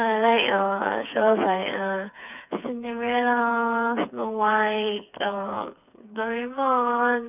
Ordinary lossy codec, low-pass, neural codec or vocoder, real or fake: none; 3.6 kHz; codec, 16 kHz, 4 kbps, FreqCodec, smaller model; fake